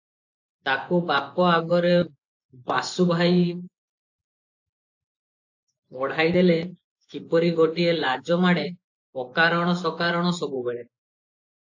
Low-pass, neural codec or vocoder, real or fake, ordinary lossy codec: 7.2 kHz; vocoder, 24 kHz, 100 mel bands, Vocos; fake; AAC, 48 kbps